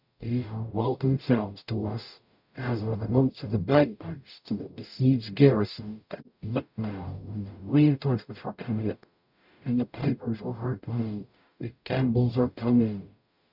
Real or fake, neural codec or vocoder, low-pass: fake; codec, 44.1 kHz, 0.9 kbps, DAC; 5.4 kHz